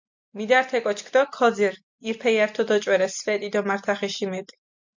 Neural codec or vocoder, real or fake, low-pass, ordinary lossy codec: none; real; 7.2 kHz; MP3, 48 kbps